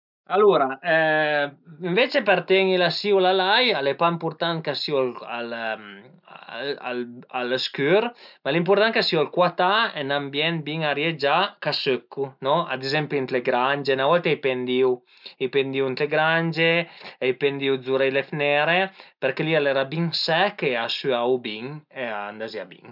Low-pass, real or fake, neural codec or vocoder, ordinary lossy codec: 5.4 kHz; real; none; none